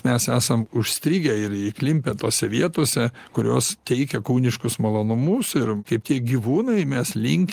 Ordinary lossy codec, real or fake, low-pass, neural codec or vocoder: Opus, 24 kbps; real; 14.4 kHz; none